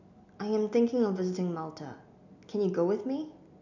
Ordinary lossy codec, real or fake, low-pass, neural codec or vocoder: none; real; 7.2 kHz; none